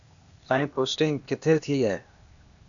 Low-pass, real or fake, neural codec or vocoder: 7.2 kHz; fake; codec, 16 kHz, 0.8 kbps, ZipCodec